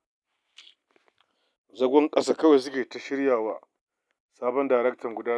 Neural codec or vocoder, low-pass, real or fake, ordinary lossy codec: none; none; real; none